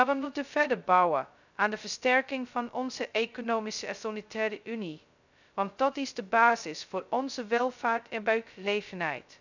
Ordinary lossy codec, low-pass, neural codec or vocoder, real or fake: none; 7.2 kHz; codec, 16 kHz, 0.2 kbps, FocalCodec; fake